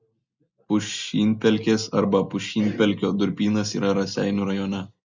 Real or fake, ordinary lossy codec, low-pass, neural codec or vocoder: real; Opus, 64 kbps; 7.2 kHz; none